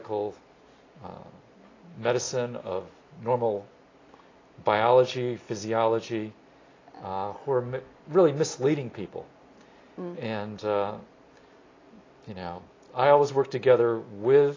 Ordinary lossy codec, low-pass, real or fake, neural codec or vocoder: AAC, 32 kbps; 7.2 kHz; real; none